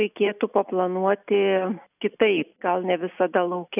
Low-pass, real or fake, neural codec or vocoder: 3.6 kHz; real; none